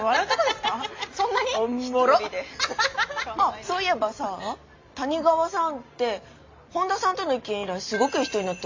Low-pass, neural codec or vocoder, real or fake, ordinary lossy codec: 7.2 kHz; none; real; MP3, 48 kbps